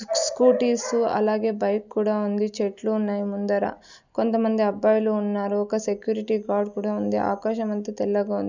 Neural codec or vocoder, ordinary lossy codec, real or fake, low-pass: none; none; real; 7.2 kHz